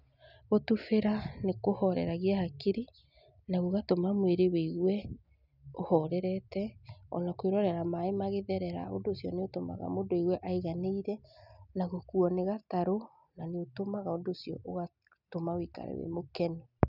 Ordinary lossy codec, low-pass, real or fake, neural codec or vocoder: none; 5.4 kHz; real; none